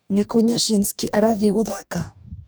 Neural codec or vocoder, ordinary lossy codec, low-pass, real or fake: codec, 44.1 kHz, 2.6 kbps, DAC; none; none; fake